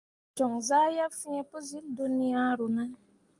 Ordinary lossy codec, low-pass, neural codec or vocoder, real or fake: Opus, 32 kbps; 10.8 kHz; none; real